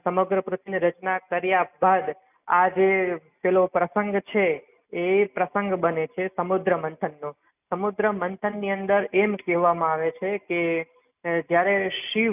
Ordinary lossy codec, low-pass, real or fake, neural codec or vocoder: none; 3.6 kHz; real; none